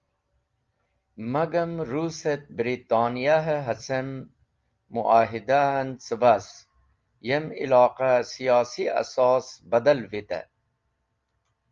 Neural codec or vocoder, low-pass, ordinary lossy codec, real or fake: none; 7.2 kHz; Opus, 32 kbps; real